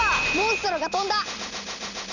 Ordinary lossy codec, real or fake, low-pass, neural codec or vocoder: none; real; 7.2 kHz; none